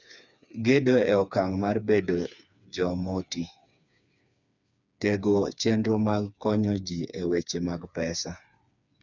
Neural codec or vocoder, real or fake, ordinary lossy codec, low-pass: codec, 16 kHz, 4 kbps, FreqCodec, smaller model; fake; none; 7.2 kHz